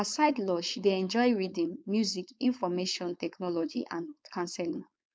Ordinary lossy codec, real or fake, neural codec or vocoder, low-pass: none; fake; codec, 16 kHz, 4.8 kbps, FACodec; none